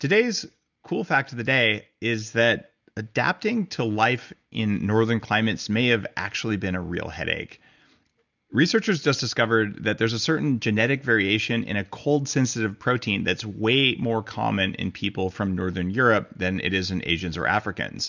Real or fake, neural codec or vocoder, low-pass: real; none; 7.2 kHz